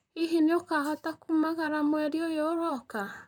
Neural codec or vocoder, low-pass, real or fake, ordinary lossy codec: vocoder, 44.1 kHz, 128 mel bands, Pupu-Vocoder; 19.8 kHz; fake; none